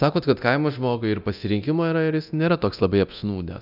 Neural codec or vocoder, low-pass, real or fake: codec, 24 kHz, 0.9 kbps, DualCodec; 5.4 kHz; fake